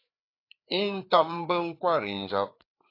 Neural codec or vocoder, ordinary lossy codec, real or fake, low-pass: codec, 16 kHz, 4 kbps, FreqCodec, larger model; AAC, 32 kbps; fake; 5.4 kHz